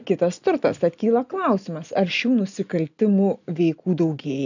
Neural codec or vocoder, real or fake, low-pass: none; real; 7.2 kHz